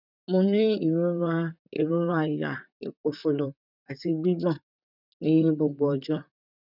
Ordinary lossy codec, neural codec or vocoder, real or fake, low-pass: none; vocoder, 44.1 kHz, 128 mel bands, Pupu-Vocoder; fake; 5.4 kHz